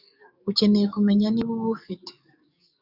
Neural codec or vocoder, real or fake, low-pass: codec, 44.1 kHz, 7.8 kbps, DAC; fake; 5.4 kHz